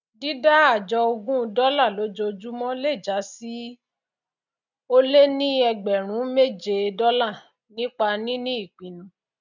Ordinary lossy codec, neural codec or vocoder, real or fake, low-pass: none; none; real; 7.2 kHz